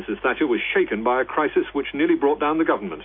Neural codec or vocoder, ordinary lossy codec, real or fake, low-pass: none; MP3, 32 kbps; real; 5.4 kHz